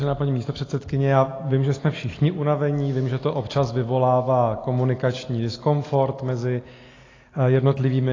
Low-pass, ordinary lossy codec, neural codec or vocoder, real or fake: 7.2 kHz; AAC, 32 kbps; none; real